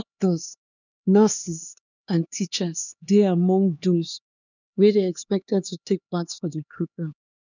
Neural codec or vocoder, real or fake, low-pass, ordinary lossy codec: codec, 16 kHz, 2 kbps, X-Codec, HuBERT features, trained on LibriSpeech; fake; 7.2 kHz; none